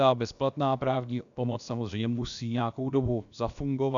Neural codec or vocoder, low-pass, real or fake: codec, 16 kHz, about 1 kbps, DyCAST, with the encoder's durations; 7.2 kHz; fake